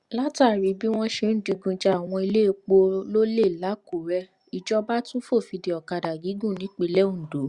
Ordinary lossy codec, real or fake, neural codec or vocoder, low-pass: Opus, 64 kbps; real; none; 10.8 kHz